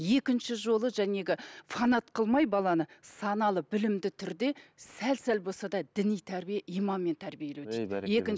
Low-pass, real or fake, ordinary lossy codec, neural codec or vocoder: none; real; none; none